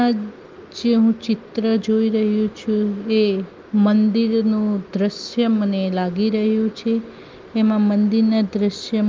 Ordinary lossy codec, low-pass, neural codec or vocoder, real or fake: Opus, 32 kbps; 7.2 kHz; none; real